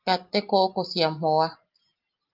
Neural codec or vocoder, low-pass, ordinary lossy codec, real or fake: none; 5.4 kHz; Opus, 24 kbps; real